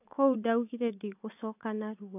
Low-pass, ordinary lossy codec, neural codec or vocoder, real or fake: 3.6 kHz; AAC, 32 kbps; vocoder, 24 kHz, 100 mel bands, Vocos; fake